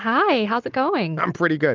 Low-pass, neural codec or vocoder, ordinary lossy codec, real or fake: 7.2 kHz; none; Opus, 24 kbps; real